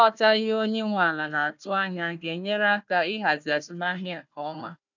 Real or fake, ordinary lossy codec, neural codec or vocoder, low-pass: fake; none; codec, 16 kHz, 1 kbps, FunCodec, trained on Chinese and English, 50 frames a second; 7.2 kHz